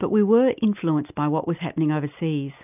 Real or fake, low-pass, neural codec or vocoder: real; 3.6 kHz; none